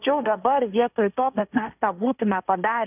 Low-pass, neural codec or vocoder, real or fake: 3.6 kHz; codec, 16 kHz, 1.1 kbps, Voila-Tokenizer; fake